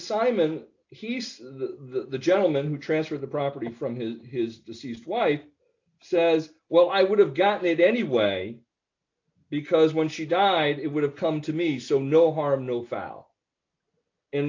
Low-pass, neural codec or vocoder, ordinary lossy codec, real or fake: 7.2 kHz; none; AAC, 48 kbps; real